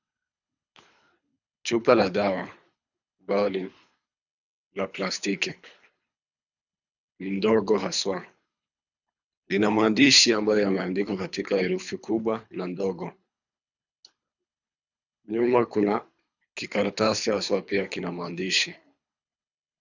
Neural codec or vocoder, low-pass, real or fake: codec, 24 kHz, 3 kbps, HILCodec; 7.2 kHz; fake